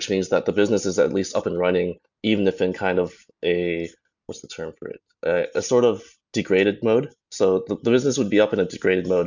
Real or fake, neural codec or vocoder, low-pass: real; none; 7.2 kHz